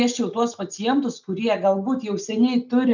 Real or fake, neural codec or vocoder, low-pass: real; none; 7.2 kHz